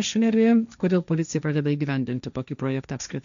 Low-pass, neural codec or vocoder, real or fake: 7.2 kHz; codec, 16 kHz, 1.1 kbps, Voila-Tokenizer; fake